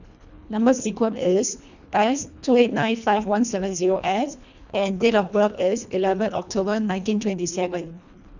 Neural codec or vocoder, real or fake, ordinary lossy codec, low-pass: codec, 24 kHz, 1.5 kbps, HILCodec; fake; none; 7.2 kHz